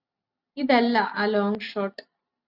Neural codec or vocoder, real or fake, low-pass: none; real; 5.4 kHz